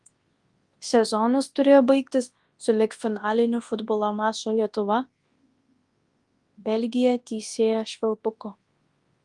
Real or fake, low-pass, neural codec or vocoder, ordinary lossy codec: fake; 10.8 kHz; codec, 24 kHz, 0.9 kbps, WavTokenizer, large speech release; Opus, 24 kbps